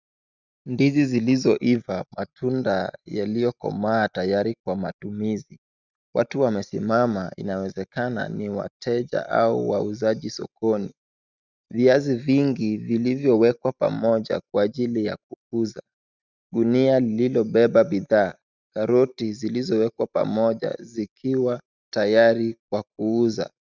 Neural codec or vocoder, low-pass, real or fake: none; 7.2 kHz; real